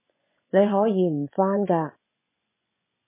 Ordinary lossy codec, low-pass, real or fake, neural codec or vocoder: MP3, 16 kbps; 3.6 kHz; fake; vocoder, 24 kHz, 100 mel bands, Vocos